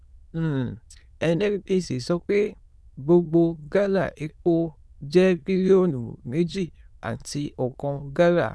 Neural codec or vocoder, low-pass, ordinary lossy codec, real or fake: autoencoder, 22.05 kHz, a latent of 192 numbers a frame, VITS, trained on many speakers; none; none; fake